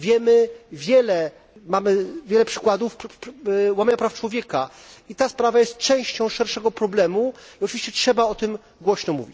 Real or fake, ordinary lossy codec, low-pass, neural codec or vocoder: real; none; none; none